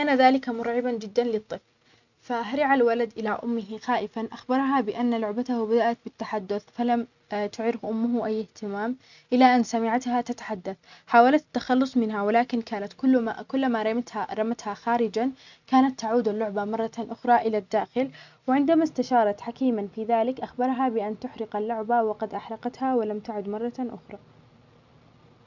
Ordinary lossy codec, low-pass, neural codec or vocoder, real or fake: none; 7.2 kHz; none; real